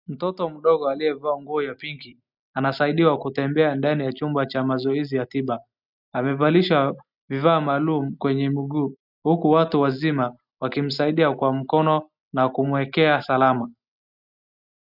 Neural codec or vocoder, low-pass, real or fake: none; 5.4 kHz; real